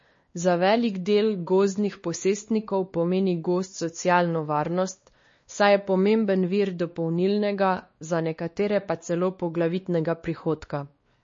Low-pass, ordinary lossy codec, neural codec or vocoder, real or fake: 7.2 kHz; MP3, 32 kbps; codec, 16 kHz, 2 kbps, X-Codec, WavLM features, trained on Multilingual LibriSpeech; fake